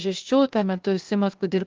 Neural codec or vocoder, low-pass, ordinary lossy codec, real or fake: codec, 16 kHz, 0.8 kbps, ZipCodec; 7.2 kHz; Opus, 24 kbps; fake